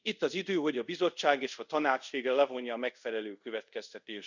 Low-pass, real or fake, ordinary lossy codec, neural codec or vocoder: 7.2 kHz; fake; none; codec, 24 kHz, 0.5 kbps, DualCodec